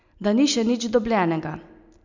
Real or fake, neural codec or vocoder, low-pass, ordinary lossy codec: real; none; 7.2 kHz; none